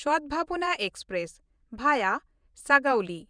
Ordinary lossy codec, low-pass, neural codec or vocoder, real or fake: none; 9.9 kHz; vocoder, 48 kHz, 128 mel bands, Vocos; fake